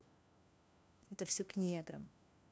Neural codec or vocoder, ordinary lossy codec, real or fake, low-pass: codec, 16 kHz, 1 kbps, FunCodec, trained on LibriTTS, 50 frames a second; none; fake; none